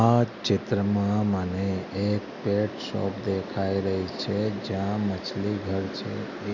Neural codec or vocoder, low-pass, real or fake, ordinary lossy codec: none; 7.2 kHz; real; none